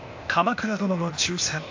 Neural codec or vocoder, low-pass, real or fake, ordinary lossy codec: codec, 16 kHz, 0.8 kbps, ZipCodec; 7.2 kHz; fake; AAC, 48 kbps